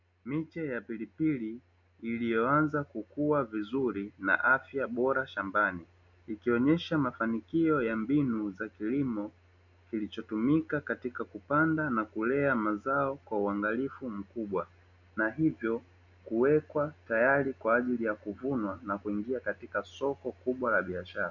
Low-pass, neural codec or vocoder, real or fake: 7.2 kHz; none; real